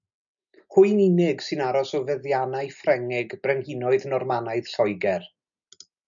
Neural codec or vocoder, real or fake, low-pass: none; real; 7.2 kHz